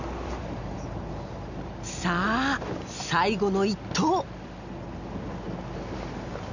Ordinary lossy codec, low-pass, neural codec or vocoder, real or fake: none; 7.2 kHz; none; real